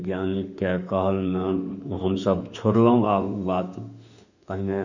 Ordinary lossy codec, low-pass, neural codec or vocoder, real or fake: none; 7.2 kHz; autoencoder, 48 kHz, 32 numbers a frame, DAC-VAE, trained on Japanese speech; fake